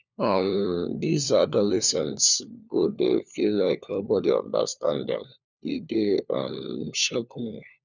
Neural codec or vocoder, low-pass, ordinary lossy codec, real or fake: codec, 16 kHz, 4 kbps, FunCodec, trained on LibriTTS, 50 frames a second; 7.2 kHz; none; fake